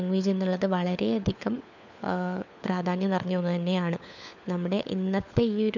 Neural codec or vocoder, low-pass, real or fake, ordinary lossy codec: codec, 16 kHz, 4 kbps, FunCodec, trained on LibriTTS, 50 frames a second; 7.2 kHz; fake; none